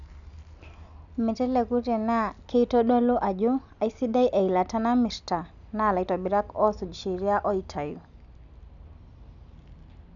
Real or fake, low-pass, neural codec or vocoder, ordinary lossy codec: real; 7.2 kHz; none; none